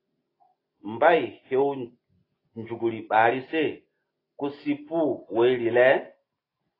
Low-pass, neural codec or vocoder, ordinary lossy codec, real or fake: 5.4 kHz; none; AAC, 24 kbps; real